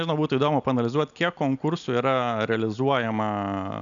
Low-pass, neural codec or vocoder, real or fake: 7.2 kHz; none; real